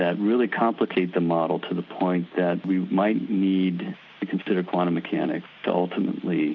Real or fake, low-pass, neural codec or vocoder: real; 7.2 kHz; none